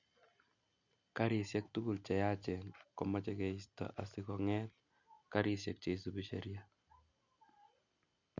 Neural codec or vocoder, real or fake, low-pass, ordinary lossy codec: none; real; 7.2 kHz; none